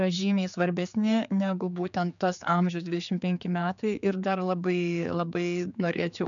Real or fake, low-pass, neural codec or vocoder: fake; 7.2 kHz; codec, 16 kHz, 4 kbps, X-Codec, HuBERT features, trained on general audio